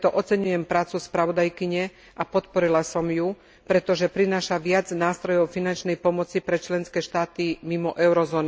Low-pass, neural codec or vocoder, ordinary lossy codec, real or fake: none; none; none; real